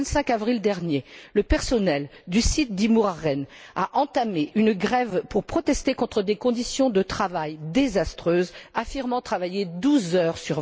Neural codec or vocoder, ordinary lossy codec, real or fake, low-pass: none; none; real; none